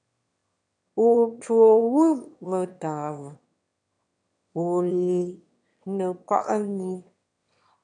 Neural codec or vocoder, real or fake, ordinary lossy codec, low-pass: autoencoder, 22.05 kHz, a latent of 192 numbers a frame, VITS, trained on one speaker; fake; AAC, 64 kbps; 9.9 kHz